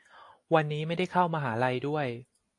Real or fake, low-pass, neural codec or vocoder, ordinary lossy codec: real; 10.8 kHz; none; AAC, 48 kbps